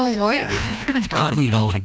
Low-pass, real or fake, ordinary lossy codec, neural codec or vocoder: none; fake; none; codec, 16 kHz, 1 kbps, FreqCodec, larger model